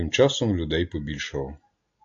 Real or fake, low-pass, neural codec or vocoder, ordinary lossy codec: real; 7.2 kHz; none; AAC, 64 kbps